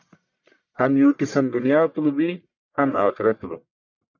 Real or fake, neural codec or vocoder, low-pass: fake; codec, 44.1 kHz, 1.7 kbps, Pupu-Codec; 7.2 kHz